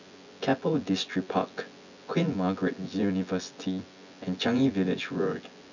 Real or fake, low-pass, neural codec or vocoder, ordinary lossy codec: fake; 7.2 kHz; vocoder, 24 kHz, 100 mel bands, Vocos; none